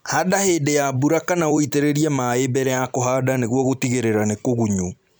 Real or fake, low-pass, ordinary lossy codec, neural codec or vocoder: fake; none; none; vocoder, 44.1 kHz, 128 mel bands every 256 samples, BigVGAN v2